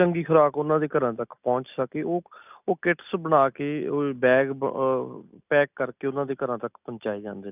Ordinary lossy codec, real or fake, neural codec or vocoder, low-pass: none; real; none; 3.6 kHz